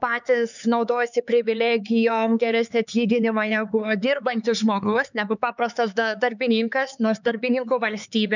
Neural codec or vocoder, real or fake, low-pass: codec, 16 kHz, 4 kbps, X-Codec, HuBERT features, trained on LibriSpeech; fake; 7.2 kHz